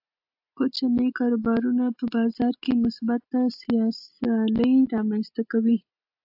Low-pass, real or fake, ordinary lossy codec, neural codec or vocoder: 5.4 kHz; real; AAC, 48 kbps; none